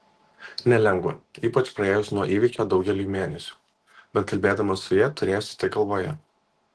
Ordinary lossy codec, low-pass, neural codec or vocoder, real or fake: Opus, 16 kbps; 10.8 kHz; autoencoder, 48 kHz, 128 numbers a frame, DAC-VAE, trained on Japanese speech; fake